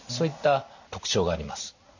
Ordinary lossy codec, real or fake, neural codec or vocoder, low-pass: none; real; none; 7.2 kHz